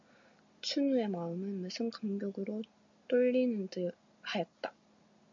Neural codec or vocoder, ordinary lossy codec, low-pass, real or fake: none; MP3, 96 kbps; 7.2 kHz; real